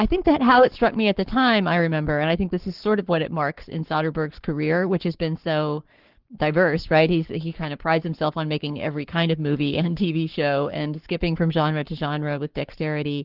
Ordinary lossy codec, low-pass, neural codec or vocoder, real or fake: Opus, 16 kbps; 5.4 kHz; codec, 44.1 kHz, 7.8 kbps, Pupu-Codec; fake